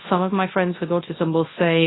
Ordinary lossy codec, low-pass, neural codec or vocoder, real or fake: AAC, 16 kbps; 7.2 kHz; codec, 24 kHz, 0.9 kbps, WavTokenizer, large speech release; fake